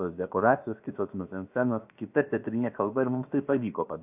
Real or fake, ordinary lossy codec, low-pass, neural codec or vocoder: fake; AAC, 32 kbps; 3.6 kHz; codec, 16 kHz, about 1 kbps, DyCAST, with the encoder's durations